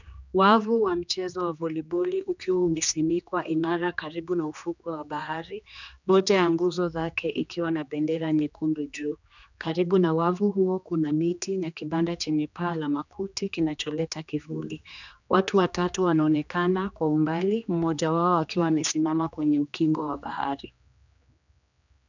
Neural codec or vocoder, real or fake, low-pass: codec, 16 kHz, 2 kbps, X-Codec, HuBERT features, trained on general audio; fake; 7.2 kHz